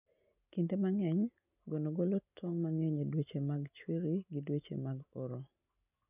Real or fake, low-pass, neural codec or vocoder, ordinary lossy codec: real; 3.6 kHz; none; none